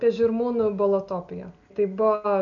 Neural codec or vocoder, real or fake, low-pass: none; real; 7.2 kHz